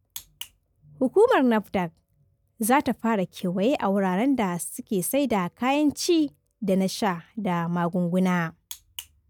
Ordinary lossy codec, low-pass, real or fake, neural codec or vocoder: none; none; real; none